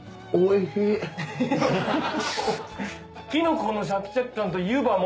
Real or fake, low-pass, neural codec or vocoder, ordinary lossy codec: real; none; none; none